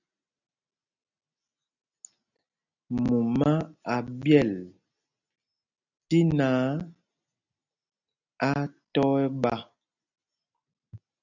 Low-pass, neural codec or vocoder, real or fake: 7.2 kHz; none; real